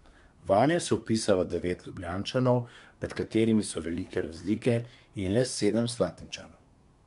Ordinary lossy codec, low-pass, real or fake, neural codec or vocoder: MP3, 96 kbps; 10.8 kHz; fake; codec, 24 kHz, 1 kbps, SNAC